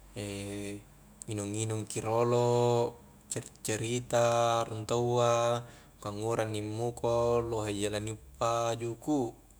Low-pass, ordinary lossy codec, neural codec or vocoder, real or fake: none; none; autoencoder, 48 kHz, 128 numbers a frame, DAC-VAE, trained on Japanese speech; fake